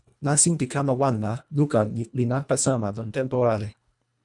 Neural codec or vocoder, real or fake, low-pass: codec, 24 kHz, 1.5 kbps, HILCodec; fake; 10.8 kHz